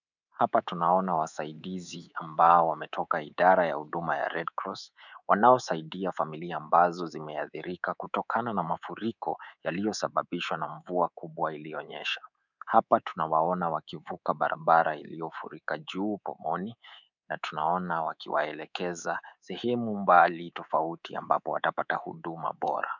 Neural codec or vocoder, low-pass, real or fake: codec, 24 kHz, 3.1 kbps, DualCodec; 7.2 kHz; fake